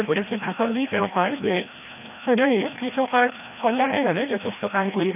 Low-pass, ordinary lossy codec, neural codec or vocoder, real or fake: 3.6 kHz; none; codec, 16 kHz, 1 kbps, FreqCodec, larger model; fake